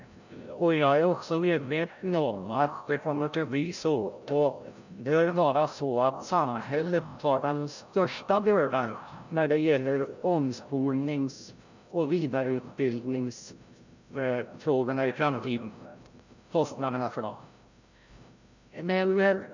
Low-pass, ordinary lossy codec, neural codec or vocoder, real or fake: 7.2 kHz; none; codec, 16 kHz, 0.5 kbps, FreqCodec, larger model; fake